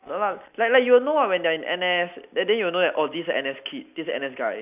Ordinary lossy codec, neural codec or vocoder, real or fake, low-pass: none; none; real; 3.6 kHz